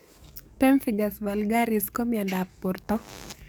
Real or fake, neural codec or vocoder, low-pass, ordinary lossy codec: fake; codec, 44.1 kHz, 7.8 kbps, DAC; none; none